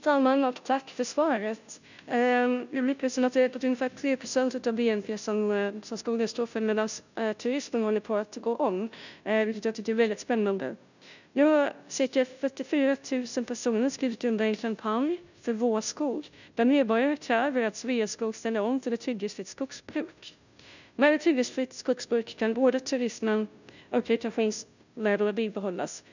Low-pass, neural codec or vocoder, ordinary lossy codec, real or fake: 7.2 kHz; codec, 16 kHz, 0.5 kbps, FunCodec, trained on Chinese and English, 25 frames a second; none; fake